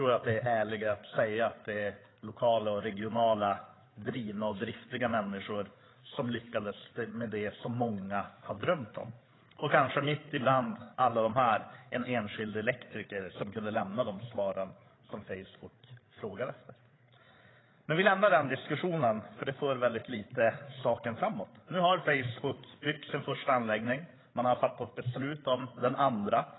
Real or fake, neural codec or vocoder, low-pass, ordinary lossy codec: fake; codec, 16 kHz, 8 kbps, FreqCodec, larger model; 7.2 kHz; AAC, 16 kbps